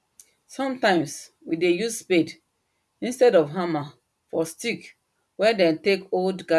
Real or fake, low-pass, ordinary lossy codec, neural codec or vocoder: real; none; none; none